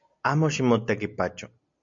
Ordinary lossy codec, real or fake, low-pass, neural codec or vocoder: MP3, 64 kbps; real; 7.2 kHz; none